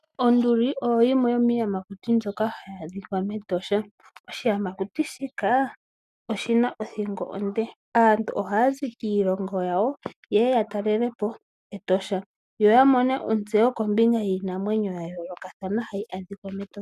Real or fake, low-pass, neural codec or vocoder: real; 14.4 kHz; none